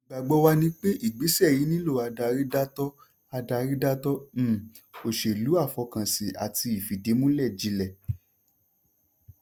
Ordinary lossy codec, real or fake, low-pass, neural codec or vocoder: none; real; none; none